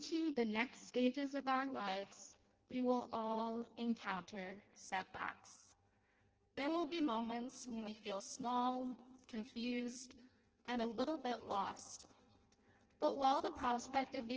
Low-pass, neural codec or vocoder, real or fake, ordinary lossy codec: 7.2 kHz; codec, 16 kHz in and 24 kHz out, 0.6 kbps, FireRedTTS-2 codec; fake; Opus, 16 kbps